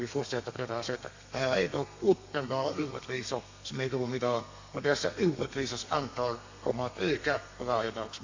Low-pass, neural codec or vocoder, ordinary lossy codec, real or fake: 7.2 kHz; codec, 32 kHz, 1.9 kbps, SNAC; AAC, 48 kbps; fake